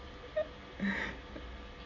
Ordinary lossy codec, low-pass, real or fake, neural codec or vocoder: AAC, 48 kbps; 7.2 kHz; real; none